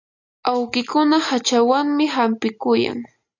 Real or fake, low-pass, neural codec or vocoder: real; 7.2 kHz; none